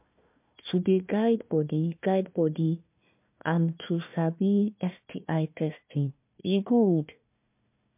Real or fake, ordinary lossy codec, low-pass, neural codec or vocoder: fake; MP3, 32 kbps; 3.6 kHz; codec, 16 kHz, 1 kbps, FunCodec, trained on Chinese and English, 50 frames a second